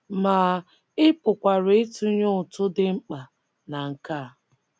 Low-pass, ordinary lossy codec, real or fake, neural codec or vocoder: none; none; real; none